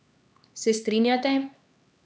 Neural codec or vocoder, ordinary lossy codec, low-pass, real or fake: codec, 16 kHz, 2 kbps, X-Codec, HuBERT features, trained on LibriSpeech; none; none; fake